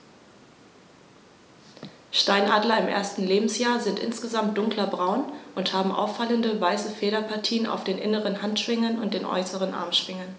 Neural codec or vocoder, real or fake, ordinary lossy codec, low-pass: none; real; none; none